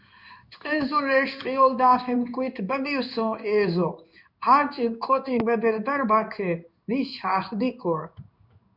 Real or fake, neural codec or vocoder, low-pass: fake; codec, 16 kHz in and 24 kHz out, 1 kbps, XY-Tokenizer; 5.4 kHz